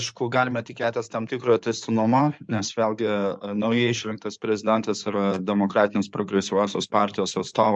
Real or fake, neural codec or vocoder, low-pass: fake; codec, 16 kHz in and 24 kHz out, 2.2 kbps, FireRedTTS-2 codec; 9.9 kHz